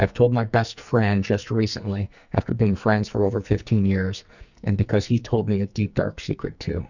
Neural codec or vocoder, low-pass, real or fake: codec, 44.1 kHz, 2.6 kbps, SNAC; 7.2 kHz; fake